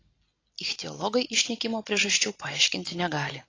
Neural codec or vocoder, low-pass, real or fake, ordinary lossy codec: none; 7.2 kHz; real; AAC, 32 kbps